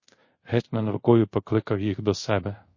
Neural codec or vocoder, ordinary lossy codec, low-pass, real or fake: codec, 24 kHz, 0.5 kbps, DualCodec; MP3, 32 kbps; 7.2 kHz; fake